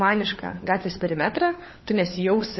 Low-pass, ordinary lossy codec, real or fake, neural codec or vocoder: 7.2 kHz; MP3, 24 kbps; fake; codec, 16 kHz, 4 kbps, FunCodec, trained on LibriTTS, 50 frames a second